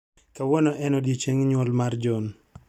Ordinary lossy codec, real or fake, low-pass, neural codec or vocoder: none; real; 19.8 kHz; none